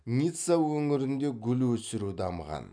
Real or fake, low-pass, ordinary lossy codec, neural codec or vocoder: real; 9.9 kHz; none; none